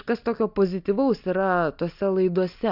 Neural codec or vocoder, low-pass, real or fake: none; 5.4 kHz; real